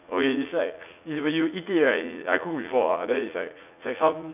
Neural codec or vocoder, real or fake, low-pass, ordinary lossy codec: vocoder, 44.1 kHz, 80 mel bands, Vocos; fake; 3.6 kHz; none